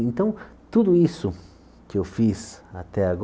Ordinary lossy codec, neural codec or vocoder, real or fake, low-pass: none; none; real; none